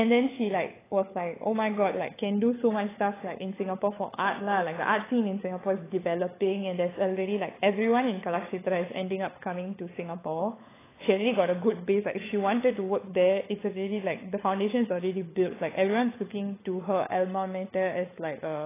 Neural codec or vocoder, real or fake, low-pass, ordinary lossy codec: codec, 16 kHz, 16 kbps, FunCodec, trained on LibriTTS, 50 frames a second; fake; 3.6 kHz; AAC, 16 kbps